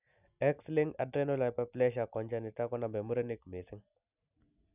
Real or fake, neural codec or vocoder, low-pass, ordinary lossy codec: real; none; 3.6 kHz; none